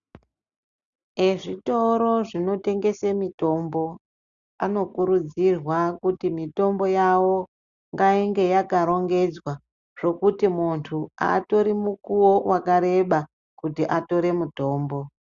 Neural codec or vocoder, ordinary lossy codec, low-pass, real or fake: none; Opus, 64 kbps; 7.2 kHz; real